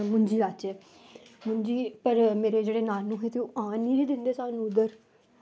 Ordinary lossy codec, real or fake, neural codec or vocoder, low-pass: none; real; none; none